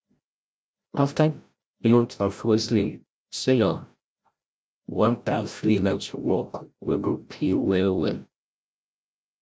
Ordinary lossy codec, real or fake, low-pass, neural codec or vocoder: none; fake; none; codec, 16 kHz, 0.5 kbps, FreqCodec, larger model